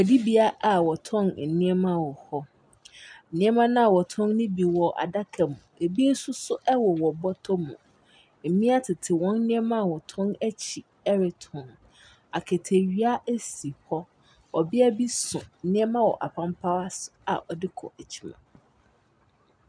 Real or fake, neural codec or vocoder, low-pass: real; none; 9.9 kHz